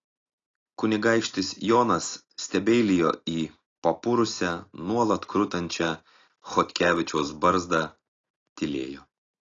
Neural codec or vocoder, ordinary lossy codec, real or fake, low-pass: none; AAC, 32 kbps; real; 7.2 kHz